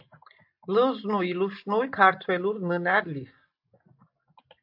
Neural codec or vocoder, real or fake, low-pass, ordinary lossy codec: none; real; 5.4 kHz; AAC, 48 kbps